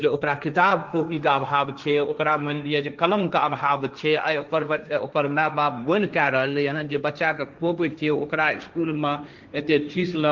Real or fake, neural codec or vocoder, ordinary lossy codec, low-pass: fake; codec, 16 kHz, 1.1 kbps, Voila-Tokenizer; Opus, 24 kbps; 7.2 kHz